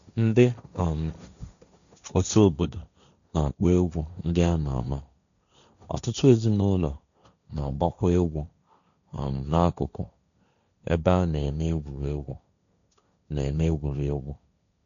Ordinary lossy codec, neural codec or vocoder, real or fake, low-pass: none; codec, 16 kHz, 1.1 kbps, Voila-Tokenizer; fake; 7.2 kHz